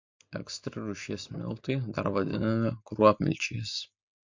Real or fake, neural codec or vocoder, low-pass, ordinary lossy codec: real; none; 7.2 kHz; MP3, 48 kbps